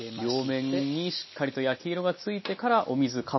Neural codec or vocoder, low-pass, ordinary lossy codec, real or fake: none; 7.2 kHz; MP3, 24 kbps; real